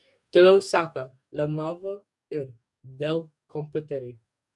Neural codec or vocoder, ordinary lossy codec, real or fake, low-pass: codec, 44.1 kHz, 2.6 kbps, DAC; MP3, 96 kbps; fake; 10.8 kHz